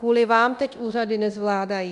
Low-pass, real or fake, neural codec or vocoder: 10.8 kHz; fake; codec, 24 kHz, 0.9 kbps, DualCodec